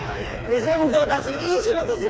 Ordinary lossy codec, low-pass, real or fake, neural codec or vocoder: none; none; fake; codec, 16 kHz, 2 kbps, FreqCodec, smaller model